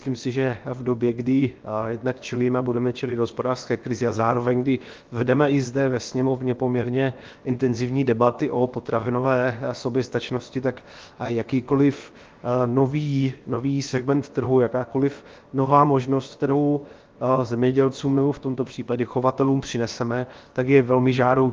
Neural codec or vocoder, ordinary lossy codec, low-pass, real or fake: codec, 16 kHz, 0.7 kbps, FocalCodec; Opus, 32 kbps; 7.2 kHz; fake